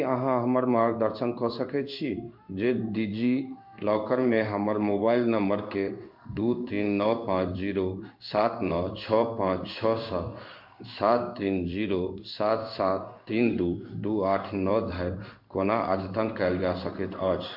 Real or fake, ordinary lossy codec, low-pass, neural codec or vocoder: fake; MP3, 48 kbps; 5.4 kHz; codec, 16 kHz in and 24 kHz out, 1 kbps, XY-Tokenizer